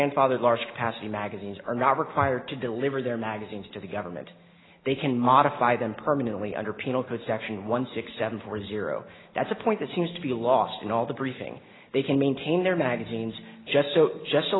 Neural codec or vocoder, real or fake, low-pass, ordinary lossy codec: none; real; 7.2 kHz; AAC, 16 kbps